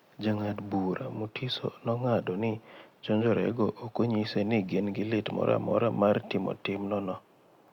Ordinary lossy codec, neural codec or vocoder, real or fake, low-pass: Opus, 64 kbps; none; real; 19.8 kHz